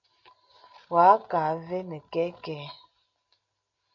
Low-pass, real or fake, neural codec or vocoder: 7.2 kHz; real; none